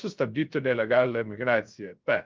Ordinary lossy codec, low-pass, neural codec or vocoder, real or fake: Opus, 32 kbps; 7.2 kHz; codec, 16 kHz, 0.3 kbps, FocalCodec; fake